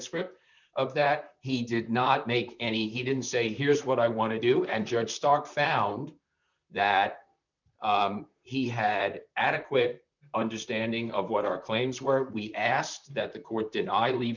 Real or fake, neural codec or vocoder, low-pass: fake; vocoder, 44.1 kHz, 128 mel bands, Pupu-Vocoder; 7.2 kHz